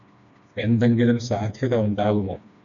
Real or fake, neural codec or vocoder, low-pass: fake; codec, 16 kHz, 2 kbps, FreqCodec, smaller model; 7.2 kHz